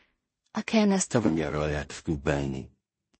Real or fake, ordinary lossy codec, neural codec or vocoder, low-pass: fake; MP3, 32 kbps; codec, 16 kHz in and 24 kHz out, 0.4 kbps, LongCat-Audio-Codec, two codebook decoder; 9.9 kHz